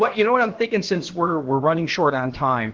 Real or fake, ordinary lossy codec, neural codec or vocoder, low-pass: fake; Opus, 16 kbps; codec, 16 kHz, about 1 kbps, DyCAST, with the encoder's durations; 7.2 kHz